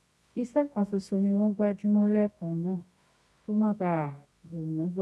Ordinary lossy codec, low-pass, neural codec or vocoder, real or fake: none; none; codec, 24 kHz, 0.9 kbps, WavTokenizer, medium music audio release; fake